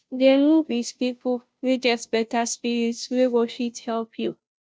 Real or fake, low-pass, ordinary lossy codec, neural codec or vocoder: fake; none; none; codec, 16 kHz, 0.5 kbps, FunCodec, trained on Chinese and English, 25 frames a second